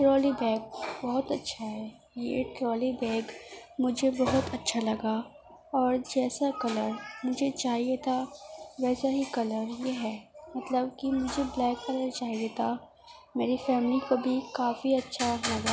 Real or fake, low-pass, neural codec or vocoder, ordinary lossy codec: real; none; none; none